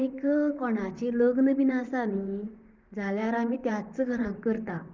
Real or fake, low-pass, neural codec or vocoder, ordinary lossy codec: fake; 7.2 kHz; vocoder, 44.1 kHz, 128 mel bands, Pupu-Vocoder; Opus, 24 kbps